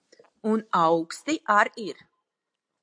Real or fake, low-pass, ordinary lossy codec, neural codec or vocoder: real; 9.9 kHz; AAC, 64 kbps; none